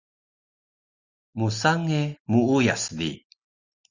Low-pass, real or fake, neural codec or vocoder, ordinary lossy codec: 7.2 kHz; real; none; Opus, 64 kbps